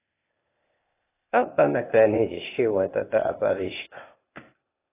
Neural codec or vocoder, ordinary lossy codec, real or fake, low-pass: codec, 16 kHz, 0.8 kbps, ZipCodec; AAC, 16 kbps; fake; 3.6 kHz